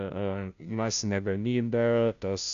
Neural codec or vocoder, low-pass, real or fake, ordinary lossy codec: codec, 16 kHz, 0.5 kbps, FunCodec, trained on Chinese and English, 25 frames a second; 7.2 kHz; fake; MP3, 96 kbps